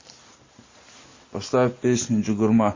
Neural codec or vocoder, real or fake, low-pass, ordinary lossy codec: none; real; 7.2 kHz; MP3, 32 kbps